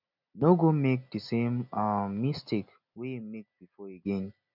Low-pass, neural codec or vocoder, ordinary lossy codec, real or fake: 5.4 kHz; none; none; real